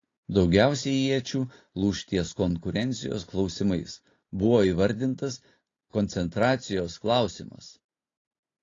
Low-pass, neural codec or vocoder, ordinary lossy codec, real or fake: 7.2 kHz; none; AAC, 32 kbps; real